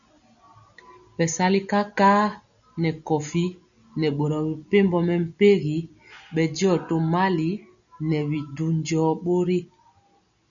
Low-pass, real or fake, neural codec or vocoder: 7.2 kHz; real; none